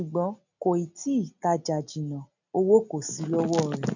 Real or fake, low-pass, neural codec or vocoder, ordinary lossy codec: real; 7.2 kHz; none; none